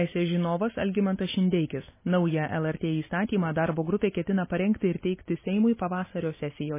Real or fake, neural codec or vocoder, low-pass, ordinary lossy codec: real; none; 3.6 kHz; MP3, 16 kbps